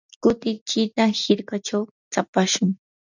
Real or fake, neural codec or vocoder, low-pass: real; none; 7.2 kHz